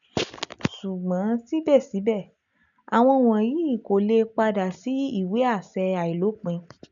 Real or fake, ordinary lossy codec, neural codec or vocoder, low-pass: real; none; none; 7.2 kHz